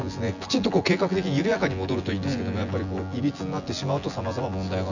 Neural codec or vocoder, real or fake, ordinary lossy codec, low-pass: vocoder, 24 kHz, 100 mel bands, Vocos; fake; none; 7.2 kHz